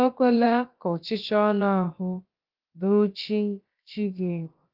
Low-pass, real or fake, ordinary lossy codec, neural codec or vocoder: 5.4 kHz; fake; Opus, 24 kbps; codec, 16 kHz, about 1 kbps, DyCAST, with the encoder's durations